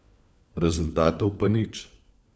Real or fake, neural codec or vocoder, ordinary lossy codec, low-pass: fake; codec, 16 kHz, 4 kbps, FunCodec, trained on LibriTTS, 50 frames a second; none; none